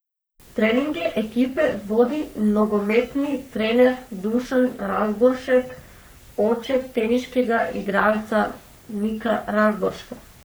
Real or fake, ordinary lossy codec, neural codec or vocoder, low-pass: fake; none; codec, 44.1 kHz, 3.4 kbps, Pupu-Codec; none